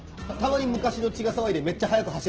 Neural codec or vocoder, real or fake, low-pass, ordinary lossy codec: none; real; 7.2 kHz; Opus, 16 kbps